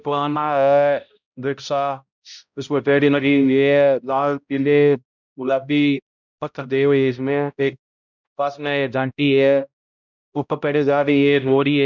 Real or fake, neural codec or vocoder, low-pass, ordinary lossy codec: fake; codec, 16 kHz, 0.5 kbps, X-Codec, HuBERT features, trained on balanced general audio; 7.2 kHz; none